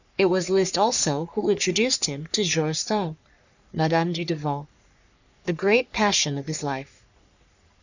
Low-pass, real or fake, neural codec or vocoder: 7.2 kHz; fake; codec, 44.1 kHz, 3.4 kbps, Pupu-Codec